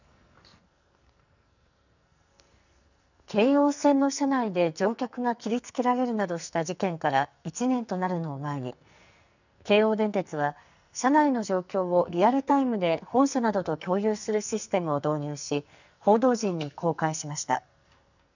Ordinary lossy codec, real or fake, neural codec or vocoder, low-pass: none; fake; codec, 44.1 kHz, 2.6 kbps, SNAC; 7.2 kHz